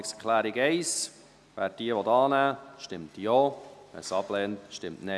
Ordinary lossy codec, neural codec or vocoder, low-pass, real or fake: none; none; none; real